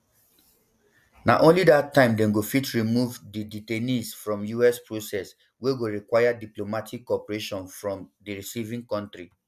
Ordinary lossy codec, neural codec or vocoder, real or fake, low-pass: none; none; real; 14.4 kHz